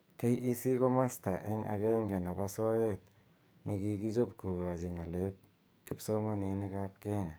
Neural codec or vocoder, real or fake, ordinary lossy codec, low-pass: codec, 44.1 kHz, 2.6 kbps, SNAC; fake; none; none